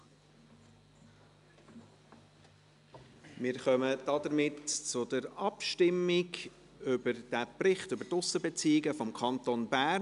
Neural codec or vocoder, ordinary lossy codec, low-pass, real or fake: none; none; 10.8 kHz; real